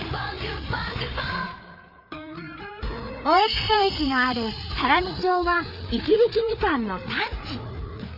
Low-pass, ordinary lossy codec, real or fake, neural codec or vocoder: 5.4 kHz; none; fake; codec, 16 kHz, 4 kbps, FreqCodec, larger model